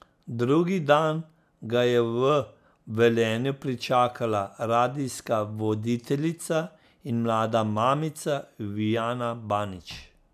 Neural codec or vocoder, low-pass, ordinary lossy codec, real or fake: none; 14.4 kHz; none; real